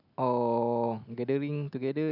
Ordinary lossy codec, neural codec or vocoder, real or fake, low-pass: none; none; real; 5.4 kHz